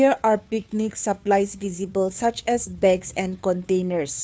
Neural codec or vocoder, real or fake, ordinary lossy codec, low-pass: codec, 16 kHz, 2 kbps, FunCodec, trained on Chinese and English, 25 frames a second; fake; none; none